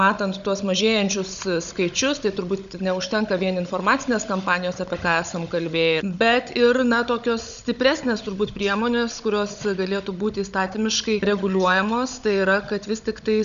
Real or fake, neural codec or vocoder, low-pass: fake; codec, 16 kHz, 16 kbps, FunCodec, trained on Chinese and English, 50 frames a second; 7.2 kHz